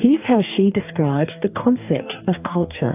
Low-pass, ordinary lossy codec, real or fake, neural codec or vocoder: 3.6 kHz; AAC, 32 kbps; fake; codec, 44.1 kHz, 2.6 kbps, DAC